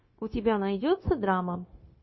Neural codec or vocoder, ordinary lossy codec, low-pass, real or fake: codec, 16 kHz, 0.9 kbps, LongCat-Audio-Codec; MP3, 24 kbps; 7.2 kHz; fake